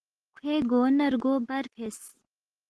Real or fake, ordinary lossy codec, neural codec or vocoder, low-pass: real; Opus, 16 kbps; none; 10.8 kHz